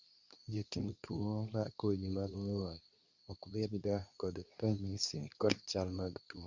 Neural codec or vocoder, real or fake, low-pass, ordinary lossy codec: codec, 24 kHz, 0.9 kbps, WavTokenizer, medium speech release version 2; fake; 7.2 kHz; Opus, 64 kbps